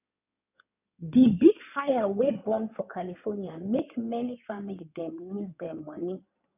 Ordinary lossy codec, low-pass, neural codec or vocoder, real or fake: none; 3.6 kHz; codec, 16 kHz in and 24 kHz out, 2.2 kbps, FireRedTTS-2 codec; fake